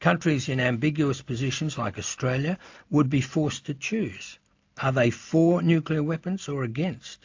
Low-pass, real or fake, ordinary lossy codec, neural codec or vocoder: 7.2 kHz; real; AAC, 48 kbps; none